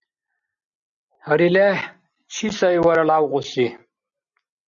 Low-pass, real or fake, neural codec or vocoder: 7.2 kHz; real; none